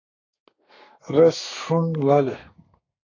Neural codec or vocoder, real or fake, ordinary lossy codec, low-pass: codec, 32 kHz, 1.9 kbps, SNAC; fake; AAC, 32 kbps; 7.2 kHz